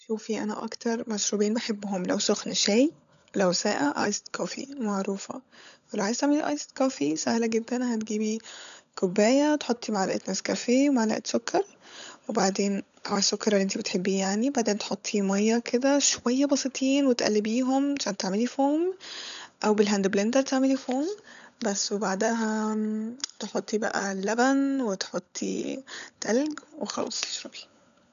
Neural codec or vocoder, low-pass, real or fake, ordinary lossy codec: codec, 16 kHz, 16 kbps, FunCodec, trained on LibriTTS, 50 frames a second; 7.2 kHz; fake; none